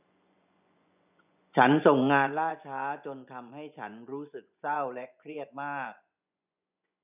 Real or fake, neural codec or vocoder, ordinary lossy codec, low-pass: fake; vocoder, 24 kHz, 100 mel bands, Vocos; none; 3.6 kHz